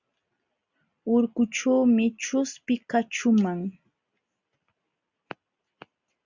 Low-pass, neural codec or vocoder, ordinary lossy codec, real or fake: 7.2 kHz; none; Opus, 64 kbps; real